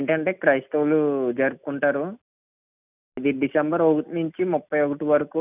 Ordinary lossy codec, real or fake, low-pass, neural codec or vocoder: none; real; 3.6 kHz; none